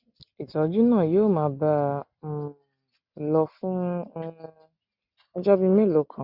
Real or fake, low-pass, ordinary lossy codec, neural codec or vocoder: real; 5.4 kHz; Opus, 64 kbps; none